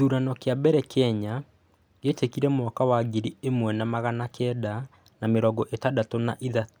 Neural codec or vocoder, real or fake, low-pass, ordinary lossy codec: none; real; none; none